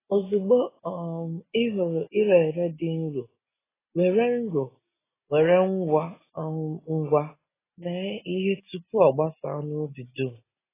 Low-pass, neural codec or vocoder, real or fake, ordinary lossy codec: 3.6 kHz; none; real; AAC, 16 kbps